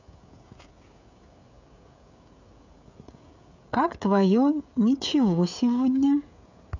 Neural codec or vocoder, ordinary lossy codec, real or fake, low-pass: codec, 16 kHz, 16 kbps, FreqCodec, smaller model; none; fake; 7.2 kHz